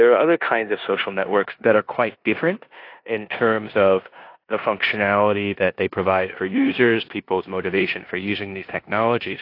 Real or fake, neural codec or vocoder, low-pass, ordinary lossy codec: fake; codec, 16 kHz in and 24 kHz out, 0.9 kbps, LongCat-Audio-Codec, four codebook decoder; 5.4 kHz; AAC, 32 kbps